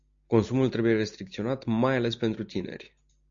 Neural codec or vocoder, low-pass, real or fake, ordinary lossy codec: none; 7.2 kHz; real; MP3, 64 kbps